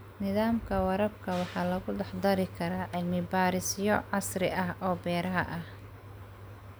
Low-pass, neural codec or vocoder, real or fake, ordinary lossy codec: none; none; real; none